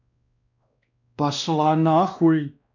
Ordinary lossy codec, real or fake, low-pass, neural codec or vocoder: none; fake; 7.2 kHz; codec, 16 kHz, 1 kbps, X-Codec, WavLM features, trained on Multilingual LibriSpeech